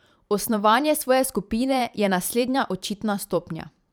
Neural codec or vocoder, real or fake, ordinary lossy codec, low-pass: vocoder, 44.1 kHz, 128 mel bands every 512 samples, BigVGAN v2; fake; none; none